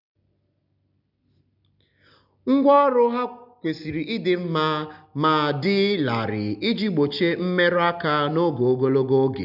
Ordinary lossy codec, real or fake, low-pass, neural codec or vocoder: none; real; 5.4 kHz; none